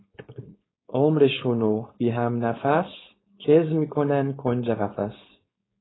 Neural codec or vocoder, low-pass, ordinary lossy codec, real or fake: codec, 16 kHz, 4.8 kbps, FACodec; 7.2 kHz; AAC, 16 kbps; fake